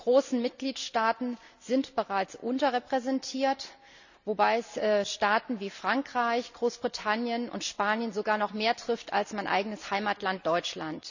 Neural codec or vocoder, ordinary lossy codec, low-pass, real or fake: none; none; 7.2 kHz; real